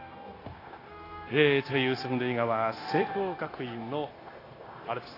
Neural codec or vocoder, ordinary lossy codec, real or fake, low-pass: codec, 16 kHz, 0.9 kbps, LongCat-Audio-Codec; AAC, 24 kbps; fake; 5.4 kHz